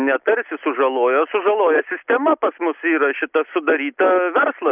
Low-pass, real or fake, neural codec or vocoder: 3.6 kHz; real; none